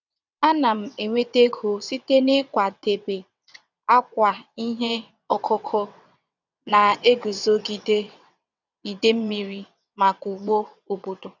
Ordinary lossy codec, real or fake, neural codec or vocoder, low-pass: none; fake; vocoder, 22.05 kHz, 80 mel bands, WaveNeXt; 7.2 kHz